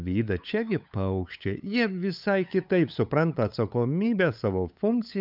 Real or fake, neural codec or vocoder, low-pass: fake; codec, 16 kHz, 8 kbps, FunCodec, trained on LibriTTS, 25 frames a second; 5.4 kHz